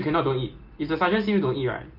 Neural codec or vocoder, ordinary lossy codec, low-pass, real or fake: none; Opus, 32 kbps; 5.4 kHz; real